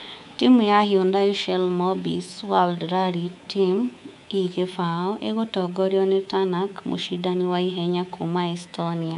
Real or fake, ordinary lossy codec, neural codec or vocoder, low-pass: fake; none; codec, 24 kHz, 3.1 kbps, DualCodec; 10.8 kHz